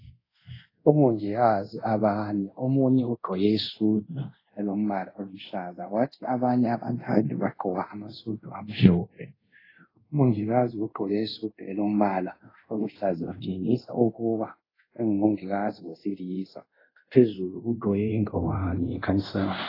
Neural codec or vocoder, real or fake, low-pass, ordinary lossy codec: codec, 24 kHz, 0.5 kbps, DualCodec; fake; 5.4 kHz; AAC, 24 kbps